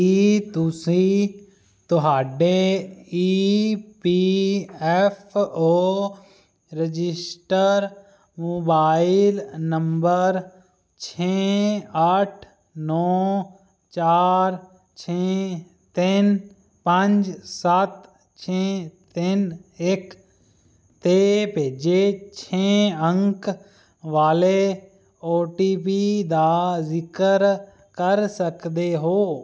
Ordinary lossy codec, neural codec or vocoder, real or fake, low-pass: none; none; real; none